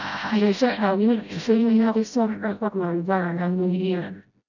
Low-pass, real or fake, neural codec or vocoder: 7.2 kHz; fake; codec, 16 kHz, 0.5 kbps, FreqCodec, smaller model